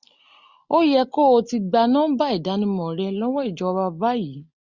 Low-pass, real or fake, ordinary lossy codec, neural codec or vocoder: 7.2 kHz; real; Opus, 64 kbps; none